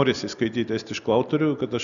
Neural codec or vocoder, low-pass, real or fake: none; 7.2 kHz; real